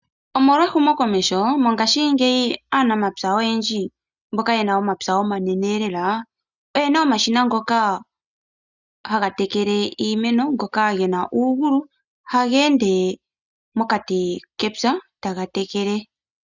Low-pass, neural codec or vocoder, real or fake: 7.2 kHz; none; real